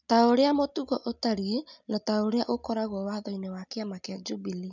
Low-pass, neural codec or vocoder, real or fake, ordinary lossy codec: 7.2 kHz; none; real; none